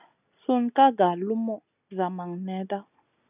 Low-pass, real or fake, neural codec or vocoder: 3.6 kHz; real; none